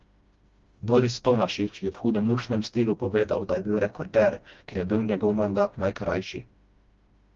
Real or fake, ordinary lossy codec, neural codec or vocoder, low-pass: fake; Opus, 32 kbps; codec, 16 kHz, 1 kbps, FreqCodec, smaller model; 7.2 kHz